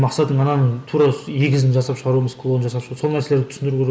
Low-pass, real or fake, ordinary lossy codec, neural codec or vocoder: none; real; none; none